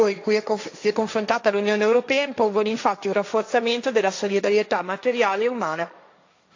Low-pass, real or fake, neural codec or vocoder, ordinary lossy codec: 7.2 kHz; fake; codec, 16 kHz, 1.1 kbps, Voila-Tokenizer; AAC, 48 kbps